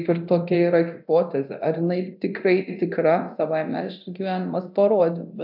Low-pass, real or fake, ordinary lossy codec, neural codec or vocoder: 5.4 kHz; fake; AAC, 48 kbps; codec, 24 kHz, 0.9 kbps, DualCodec